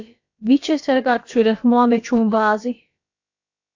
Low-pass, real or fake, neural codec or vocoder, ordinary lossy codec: 7.2 kHz; fake; codec, 16 kHz, about 1 kbps, DyCAST, with the encoder's durations; AAC, 48 kbps